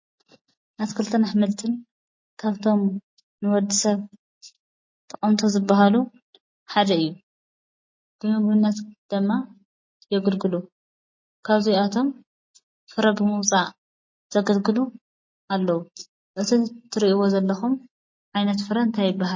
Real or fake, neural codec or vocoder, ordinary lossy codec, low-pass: real; none; MP3, 32 kbps; 7.2 kHz